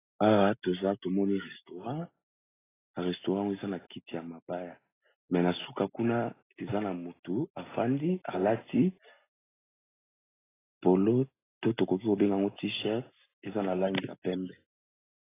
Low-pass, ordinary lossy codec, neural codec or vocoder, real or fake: 3.6 kHz; AAC, 16 kbps; none; real